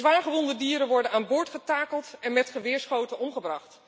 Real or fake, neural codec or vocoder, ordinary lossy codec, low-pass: real; none; none; none